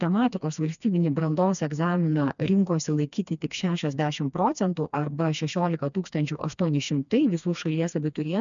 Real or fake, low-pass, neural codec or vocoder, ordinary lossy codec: fake; 7.2 kHz; codec, 16 kHz, 2 kbps, FreqCodec, smaller model; MP3, 96 kbps